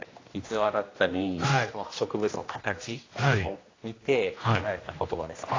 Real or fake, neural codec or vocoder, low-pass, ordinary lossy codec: fake; codec, 16 kHz, 1 kbps, X-Codec, HuBERT features, trained on general audio; 7.2 kHz; AAC, 32 kbps